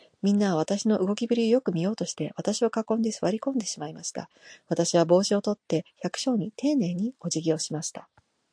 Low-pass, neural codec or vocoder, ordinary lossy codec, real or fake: 9.9 kHz; none; AAC, 64 kbps; real